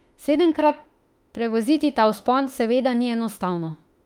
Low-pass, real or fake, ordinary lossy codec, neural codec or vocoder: 19.8 kHz; fake; Opus, 32 kbps; autoencoder, 48 kHz, 32 numbers a frame, DAC-VAE, trained on Japanese speech